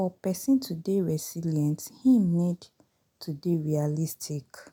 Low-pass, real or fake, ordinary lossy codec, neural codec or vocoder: none; real; none; none